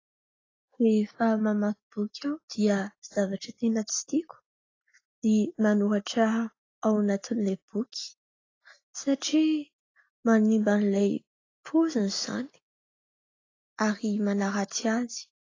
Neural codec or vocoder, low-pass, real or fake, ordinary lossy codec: none; 7.2 kHz; real; AAC, 32 kbps